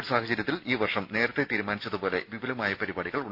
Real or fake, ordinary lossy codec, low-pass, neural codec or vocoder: real; none; 5.4 kHz; none